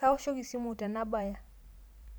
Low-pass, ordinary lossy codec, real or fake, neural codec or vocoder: none; none; fake; vocoder, 44.1 kHz, 128 mel bands every 512 samples, BigVGAN v2